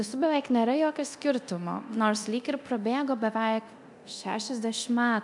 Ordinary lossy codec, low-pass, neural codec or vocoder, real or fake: MP3, 96 kbps; 10.8 kHz; codec, 24 kHz, 0.9 kbps, DualCodec; fake